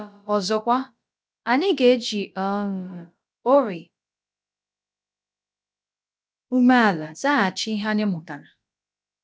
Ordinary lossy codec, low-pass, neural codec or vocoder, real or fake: none; none; codec, 16 kHz, about 1 kbps, DyCAST, with the encoder's durations; fake